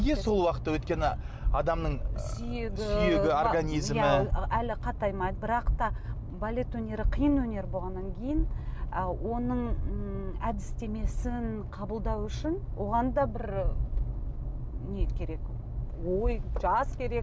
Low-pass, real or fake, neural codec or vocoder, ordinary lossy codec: none; real; none; none